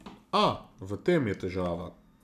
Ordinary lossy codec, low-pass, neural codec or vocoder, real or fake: none; 14.4 kHz; none; real